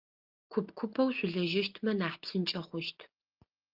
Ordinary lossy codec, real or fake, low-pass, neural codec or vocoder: Opus, 32 kbps; real; 5.4 kHz; none